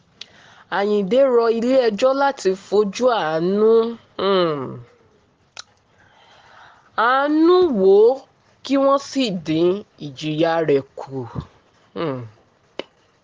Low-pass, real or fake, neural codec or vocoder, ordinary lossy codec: 7.2 kHz; real; none; Opus, 16 kbps